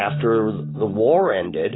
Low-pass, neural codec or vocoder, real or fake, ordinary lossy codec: 7.2 kHz; none; real; AAC, 16 kbps